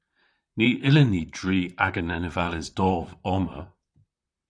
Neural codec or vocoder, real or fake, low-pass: vocoder, 44.1 kHz, 128 mel bands, Pupu-Vocoder; fake; 9.9 kHz